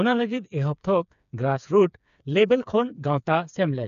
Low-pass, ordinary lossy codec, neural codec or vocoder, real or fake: 7.2 kHz; none; codec, 16 kHz, 4 kbps, FreqCodec, smaller model; fake